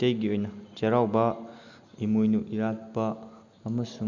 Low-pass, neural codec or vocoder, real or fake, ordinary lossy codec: 7.2 kHz; none; real; Opus, 64 kbps